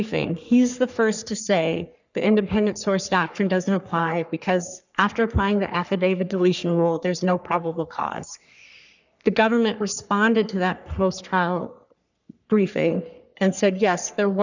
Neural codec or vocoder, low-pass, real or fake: codec, 44.1 kHz, 3.4 kbps, Pupu-Codec; 7.2 kHz; fake